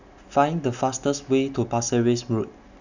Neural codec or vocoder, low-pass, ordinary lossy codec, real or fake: none; 7.2 kHz; none; real